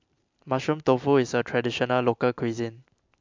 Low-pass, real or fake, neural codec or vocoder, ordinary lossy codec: 7.2 kHz; real; none; MP3, 64 kbps